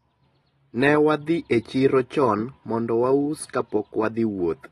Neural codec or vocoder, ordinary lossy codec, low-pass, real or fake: none; AAC, 32 kbps; 19.8 kHz; real